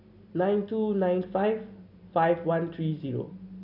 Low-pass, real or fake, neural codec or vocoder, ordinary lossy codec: 5.4 kHz; real; none; none